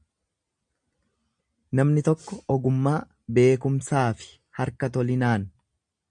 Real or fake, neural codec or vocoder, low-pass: real; none; 9.9 kHz